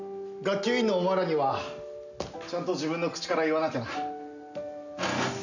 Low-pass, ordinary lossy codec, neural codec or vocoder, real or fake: 7.2 kHz; none; none; real